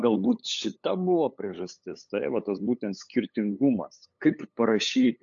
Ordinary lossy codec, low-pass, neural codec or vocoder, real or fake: AAC, 64 kbps; 7.2 kHz; codec, 16 kHz, 8 kbps, FunCodec, trained on LibriTTS, 25 frames a second; fake